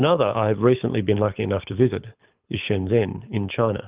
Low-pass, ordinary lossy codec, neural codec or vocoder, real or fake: 3.6 kHz; Opus, 24 kbps; codec, 24 kHz, 6 kbps, HILCodec; fake